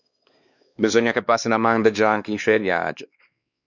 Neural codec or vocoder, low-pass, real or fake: codec, 16 kHz, 2 kbps, X-Codec, WavLM features, trained on Multilingual LibriSpeech; 7.2 kHz; fake